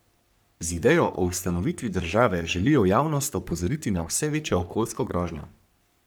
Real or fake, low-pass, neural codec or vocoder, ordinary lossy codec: fake; none; codec, 44.1 kHz, 3.4 kbps, Pupu-Codec; none